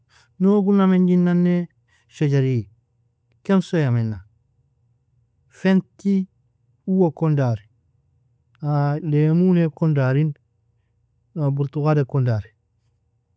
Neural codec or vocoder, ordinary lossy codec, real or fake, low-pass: none; none; real; none